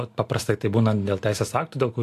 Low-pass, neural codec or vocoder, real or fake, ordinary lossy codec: 14.4 kHz; none; real; AAC, 64 kbps